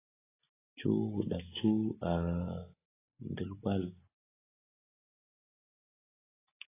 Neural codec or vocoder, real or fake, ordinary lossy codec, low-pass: none; real; AAC, 16 kbps; 3.6 kHz